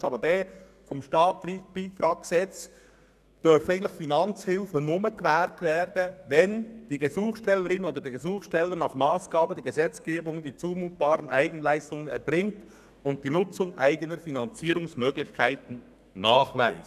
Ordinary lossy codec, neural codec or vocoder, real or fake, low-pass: none; codec, 32 kHz, 1.9 kbps, SNAC; fake; 14.4 kHz